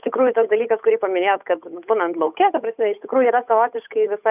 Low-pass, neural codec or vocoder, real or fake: 3.6 kHz; codec, 16 kHz, 8 kbps, FunCodec, trained on Chinese and English, 25 frames a second; fake